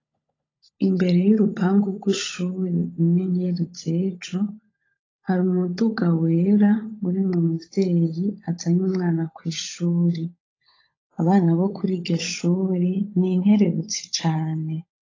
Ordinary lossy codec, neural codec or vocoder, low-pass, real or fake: AAC, 32 kbps; codec, 16 kHz, 16 kbps, FunCodec, trained on LibriTTS, 50 frames a second; 7.2 kHz; fake